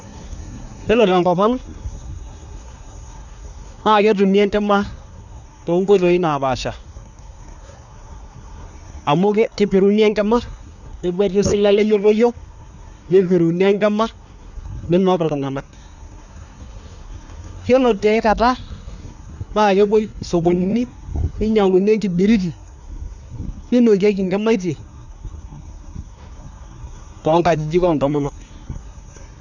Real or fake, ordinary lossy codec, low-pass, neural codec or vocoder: fake; none; 7.2 kHz; codec, 24 kHz, 1 kbps, SNAC